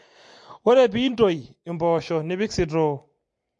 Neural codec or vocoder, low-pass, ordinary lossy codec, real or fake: none; 9.9 kHz; MP3, 48 kbps; real